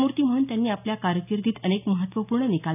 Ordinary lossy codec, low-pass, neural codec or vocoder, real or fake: none; 3.6 kHz; none; real